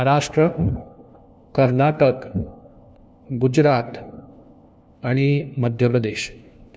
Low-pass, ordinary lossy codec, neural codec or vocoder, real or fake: none; none; codec, 16 kHz, 1 kbps, FunCodec, trained on LibriTTS, 50 frames a second; fake